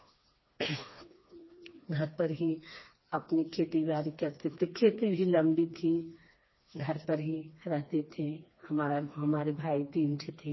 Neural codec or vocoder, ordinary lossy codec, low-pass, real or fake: codec, 16 kHz, 2 kbps, FreqCodec, smaller model; MP3, 24 kbps; 7.2 kHz; fake